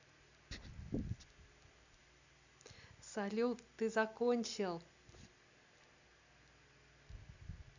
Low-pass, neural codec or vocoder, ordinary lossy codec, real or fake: 7.2 kHz; none; none; real